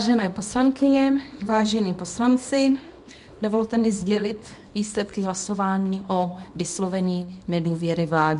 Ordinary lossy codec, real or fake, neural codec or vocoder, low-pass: MP3, 64 kbps; fake; codec, 24 kHz, 0.9 kbps, WavTokenizer, small release; 10.8 kHz